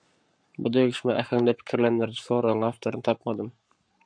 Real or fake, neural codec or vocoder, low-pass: fake; codec, 44.1 kHz, 7.8 kbps, Pupu-Codec; 9.9 kHz